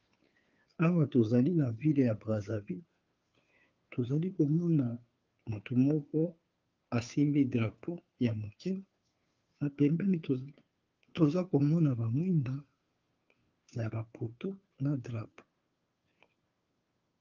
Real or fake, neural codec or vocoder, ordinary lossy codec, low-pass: fake; codec, 16 kHz, 2 kbps, FunCodec, trained on Chinese and English, 25 frames a second; Opus, 24 kbps; 7.2 kHz